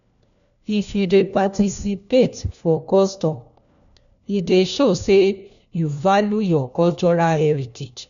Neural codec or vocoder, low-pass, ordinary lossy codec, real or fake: codec, 16 kHz, 1 kbps, FunCodec, trained on LibriTTS, 50 frames a second; 7.2 kHz; none; fake